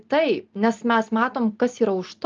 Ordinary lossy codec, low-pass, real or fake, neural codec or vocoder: Opus, 24 kbps; 7.2 kHz; real; none